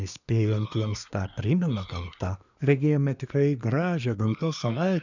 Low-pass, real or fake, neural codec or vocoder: 7.2 kHz; fake; codec, 24 kHz, 1 kbps, SNAC